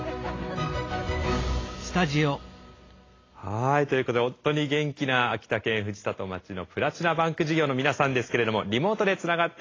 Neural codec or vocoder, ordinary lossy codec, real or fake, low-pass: none; AAC, 32 kbps; real; 7.2 kHz